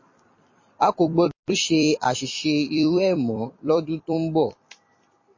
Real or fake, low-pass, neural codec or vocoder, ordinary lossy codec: fake; 7.2 kHz; vocoder, 44.1 kHz, 128 mel bands every 256 samples, BigVGAN v2; MP3, 32 kbps